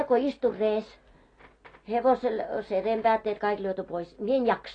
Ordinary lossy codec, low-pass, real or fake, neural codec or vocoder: AAC, 32 kbps; 9.9 kHz; real; none